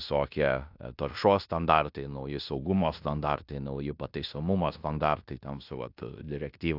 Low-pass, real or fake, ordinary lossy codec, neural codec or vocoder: 5.4 kHz; fake; AAC, 48 kbps; codec, 16 kHz in and 24 kHz out, 0.9 kbps, LongCat-Audio-Codec, fine tuned four codebook decoder